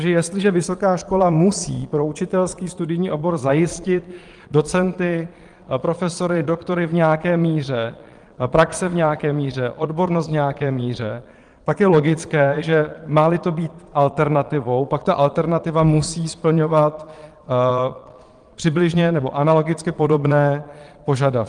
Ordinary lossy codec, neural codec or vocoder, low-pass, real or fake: Opus, 24 kbps; vocoder, 22.05 kHz, 80 mel bands, Vocos; 9.9 kHz; fake